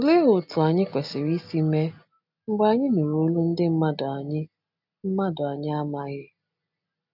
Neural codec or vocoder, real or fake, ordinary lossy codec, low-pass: none; real; none; 5.4 kHz